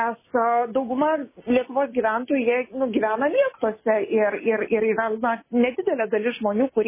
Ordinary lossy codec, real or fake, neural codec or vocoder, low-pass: MP3, 16 kbps; real; none; 3.6 kHz